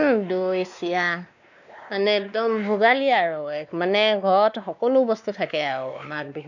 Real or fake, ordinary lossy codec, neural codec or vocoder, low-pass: fake; none; codec, 16 kHz, 2 kbps, X-Codec, WavLM features, trained on Multilingual LibriSpeech; 7.2 kHz